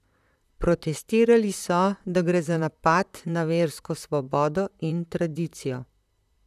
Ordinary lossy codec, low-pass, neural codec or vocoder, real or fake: none; 14.4 kHz; vocoder, 44.1 kHz, 128 mel bands, Pupu-Vocoder; fake